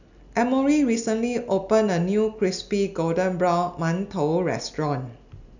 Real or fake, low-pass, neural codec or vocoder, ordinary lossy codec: real; 7.2 kHz; none; none